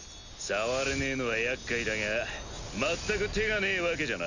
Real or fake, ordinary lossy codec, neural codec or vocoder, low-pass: real; none; none; 7.2 kHz